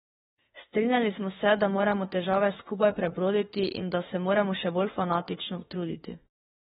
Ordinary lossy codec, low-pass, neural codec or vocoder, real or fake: AAC, 16 kbps; 19.8 kHz; autoencoder, 48 kHz, 32 numbers a frame, DAC-VAE, trained on Japanese speech; fake